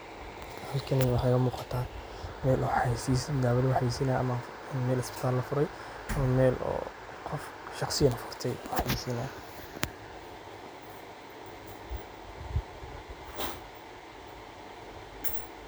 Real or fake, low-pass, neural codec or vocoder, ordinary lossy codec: real; none; none; none